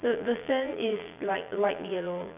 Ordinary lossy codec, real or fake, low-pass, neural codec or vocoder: AAC, 32 kbps; fake; 3.6 kHz; vocoder, 22.05 kHz, 80 mel bands, Vocos